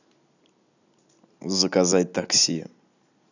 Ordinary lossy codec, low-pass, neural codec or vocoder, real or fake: none; 7.2 kHz; none; real